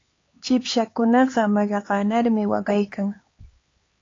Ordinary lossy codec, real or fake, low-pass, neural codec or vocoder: AAC, 32 kbps; fake; 7.2 kHz; codec, 16 kHz, 4 kbps, X-Codec, HuBERT features, trained on LibriSpeech